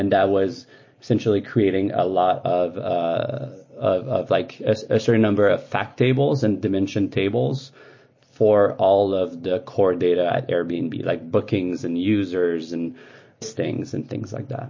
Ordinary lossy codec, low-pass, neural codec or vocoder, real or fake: MP3, 32 kbps; 7.2 kHz; none; real